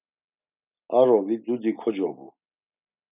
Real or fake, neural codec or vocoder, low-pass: real; none; 3.6 kHz